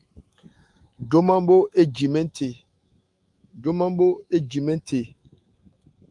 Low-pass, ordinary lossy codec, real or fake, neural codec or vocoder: 10.8 kHz; Opus, 32 kbps; fake; autoencoder, 48 kHz, 128 numbers a frame, DAC-VAE, trained on Japanese speech